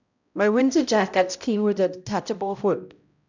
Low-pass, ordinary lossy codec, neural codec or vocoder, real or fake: 7.2 kHz; none; codec, 16 kHz, 0.5 kbps, X-Codec, HuBERT features, trained on balanced general audio; fake